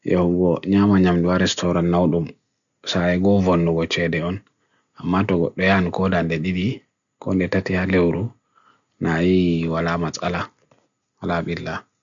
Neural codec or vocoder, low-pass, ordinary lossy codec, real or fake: none; 7.2 kHz; none; real